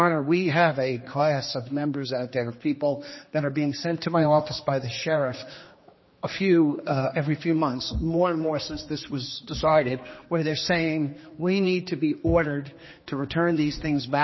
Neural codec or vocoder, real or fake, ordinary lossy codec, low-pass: codec, 16 kHz, 2 kbps, X-Codec, HuBERT features, trained on general audio; fake; MP3, 24 kbps; 7.2 kHz